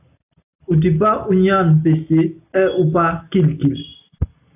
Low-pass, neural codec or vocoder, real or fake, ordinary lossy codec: 3.6 kHz; none; real; AAC, 32 kbps